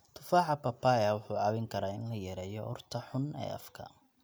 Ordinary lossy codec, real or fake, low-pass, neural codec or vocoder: none; real; none; none